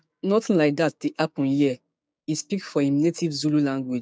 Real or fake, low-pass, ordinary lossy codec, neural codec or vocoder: fake; none; none; codec, 16 kHz, 6 kbps, DAC